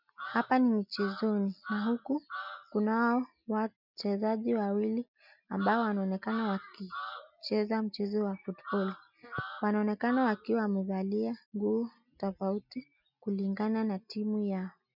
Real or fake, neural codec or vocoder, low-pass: real; none; 5.4 kHz